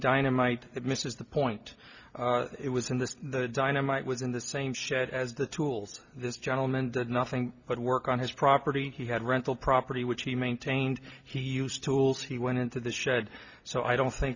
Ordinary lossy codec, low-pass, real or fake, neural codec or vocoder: Opus, 64 kbps; 7.2 kHz; real; none